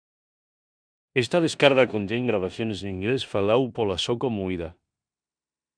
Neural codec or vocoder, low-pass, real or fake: codec, 16 kHz in and 24 kHz out, 0.9 kbps, LongCat-Audio-Codec, four codebook decoder; 9.9 kHz; fake